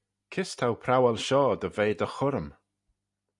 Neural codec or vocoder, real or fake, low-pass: none; real; 10.8 kHz